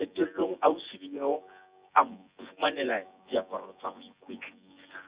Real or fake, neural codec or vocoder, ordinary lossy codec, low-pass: fake; vocoder, 24 kHz, 100 mel bands, Vocos; Opus, 64 kbps; 3.6 kHz